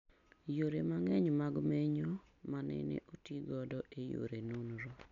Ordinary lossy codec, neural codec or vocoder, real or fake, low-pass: none; none; real; 7.2 kHz